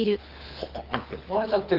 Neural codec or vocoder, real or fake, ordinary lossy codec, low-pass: codec, 24 kHz, 6 kbps, HILCodec; fake; Opus, 32 kbps; 5.4 kHz